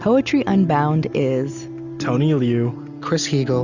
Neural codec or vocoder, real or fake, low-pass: none; real; 7.2 kHz